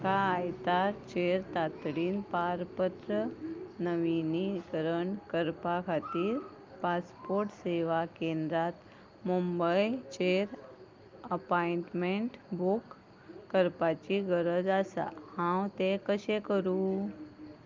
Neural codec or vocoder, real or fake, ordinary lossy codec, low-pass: none; real; Opus, 32 kbps; 7.2 kHz